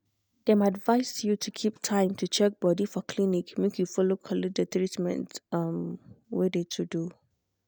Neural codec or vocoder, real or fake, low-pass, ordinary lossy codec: none; real; none; none